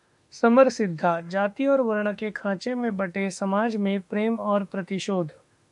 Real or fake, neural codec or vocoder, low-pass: fake; autoencoder, 48 kHz, 32 numbers a frame, DAC-VAE, trained on Japanese speech; 10.8 kHz